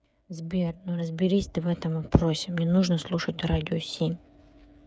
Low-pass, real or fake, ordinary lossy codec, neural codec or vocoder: none; fake; none; codec, 16 kHz, 8 kbps, FreqCodec, smaller model